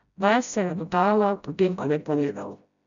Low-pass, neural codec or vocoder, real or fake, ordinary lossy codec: 7.2 kHz; codec, 16 kHz, 0.5 kbps, FreqCodec, smaller model; fake; none